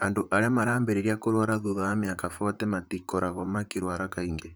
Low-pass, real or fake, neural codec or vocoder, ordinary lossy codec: none; fake; vocoder, 44.1 kHz, 128 mel bands, Pupu-Vocoder; none